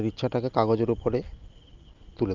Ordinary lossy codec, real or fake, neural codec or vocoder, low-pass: Opus, 24 kbps; real; none; 7.2 kHz